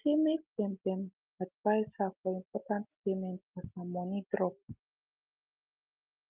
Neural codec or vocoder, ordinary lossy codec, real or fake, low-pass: none; Opus, 24 kbps; real; 3.6 kHz